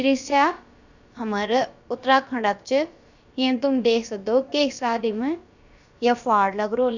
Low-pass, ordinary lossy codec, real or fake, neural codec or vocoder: 7.2 kHz; none; fake; codec, 16 kHz, about 1 kbps, DyCAST, with the encoder's durations